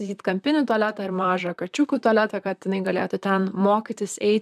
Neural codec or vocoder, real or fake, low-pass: vocoder, 44.1 kHz, 128 mel bands, Pupu-Vocoder; fake; 14.4 kHz